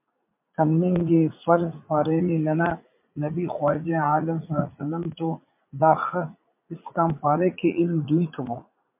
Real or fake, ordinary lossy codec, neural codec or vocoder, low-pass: fake; MP3, 32 kbps; vocoder, 44.1 kHz, 80 mel bands, Vocos; 3.6 kHz